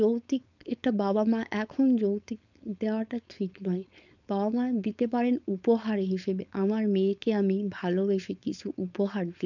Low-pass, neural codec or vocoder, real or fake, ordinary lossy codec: 7.2 kHz; codec, 16 kHz, 4.8 kbps, FACodec; fake; none